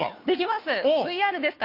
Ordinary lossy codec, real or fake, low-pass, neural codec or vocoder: AAC, 32 kbps; fake; 5.4 kHz; codec, 16 kHz, 2 kbps, FunCodec, trained on Chinese and English, 25 frames a second